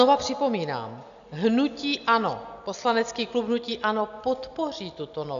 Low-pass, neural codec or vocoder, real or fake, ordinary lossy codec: 7.2 kHz; none; real; AAC, 96 kbps